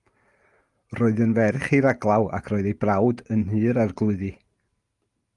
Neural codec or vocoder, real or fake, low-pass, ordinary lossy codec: none; real; 10.8 kHz; Opus, 32 kbps